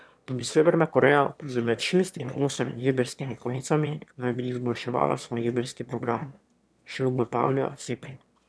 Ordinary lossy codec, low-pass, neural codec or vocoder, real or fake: none; none; autoencoder, 22.05 kHz, a latent of 192 numbers a frame, VITS, trained on one speaker; fake